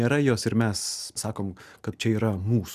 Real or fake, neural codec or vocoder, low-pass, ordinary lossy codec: real; none; 14.4 kHz; Opus, 64 kbps